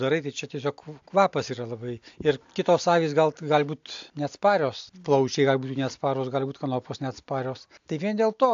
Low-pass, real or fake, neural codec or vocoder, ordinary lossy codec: 7.2 kHz; real; none; AAC, 64 kbps